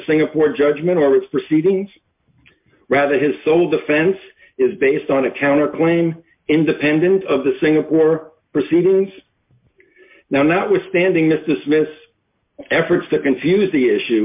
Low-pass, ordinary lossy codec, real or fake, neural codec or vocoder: 3.6 kHz; MP3, 32 kbps; real; none